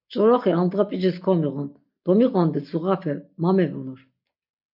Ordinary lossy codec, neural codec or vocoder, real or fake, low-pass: MP3, 48 kbps; none; real; 5.4 kHz